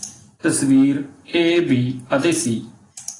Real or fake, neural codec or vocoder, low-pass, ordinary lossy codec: real; none; 10.8 kHz; AAC, 32 kbps